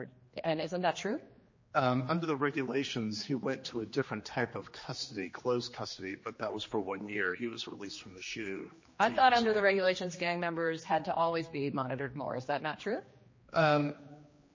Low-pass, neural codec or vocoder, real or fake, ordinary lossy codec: 7.2 kHz; codec, 16 kHz, 2 kbps, X-Codec, HuBERT features, trained on general audio; fake; MP3, 32 kbps